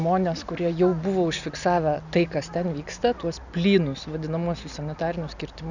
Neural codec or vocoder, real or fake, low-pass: none; real; 7.2 kHz